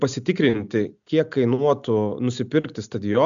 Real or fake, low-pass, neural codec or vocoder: real; 7.2 kHz; none